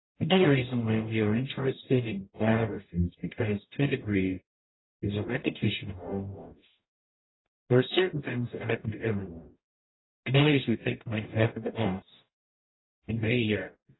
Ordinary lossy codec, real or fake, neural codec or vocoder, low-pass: AAC, 16 kbps; fake; codec, 44.1 kHz, 0.9 kbps, DAC; 7.2 kHz